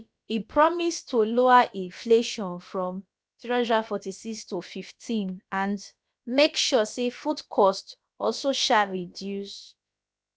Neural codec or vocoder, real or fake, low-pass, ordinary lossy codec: codec, 16 kHz, about 1 kbps, DyCAST, with the encoder's durations; fake; none; none